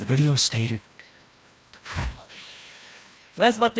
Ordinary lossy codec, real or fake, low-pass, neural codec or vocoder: none; fake; none; codec, 16 kHz, 1 kbps, FreqCodec, larger model